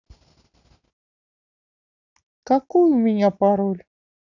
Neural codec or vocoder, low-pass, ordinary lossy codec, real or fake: none; 7.2 kHz; none; real